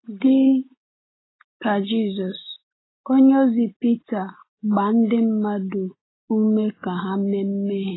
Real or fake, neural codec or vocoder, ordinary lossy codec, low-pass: real; none; AAC, 16 kbps; 7.2 kHz